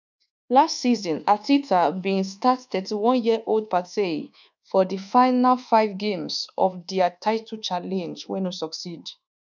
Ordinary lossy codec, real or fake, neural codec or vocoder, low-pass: none; fake; codec, 24 kHz, 1.2 kbps, DualCodec; 7.2 kHz